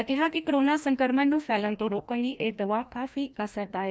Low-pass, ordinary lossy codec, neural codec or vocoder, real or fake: none; none; codec, 16 kHz, 1 kbps, FreqCodec, larger model; fake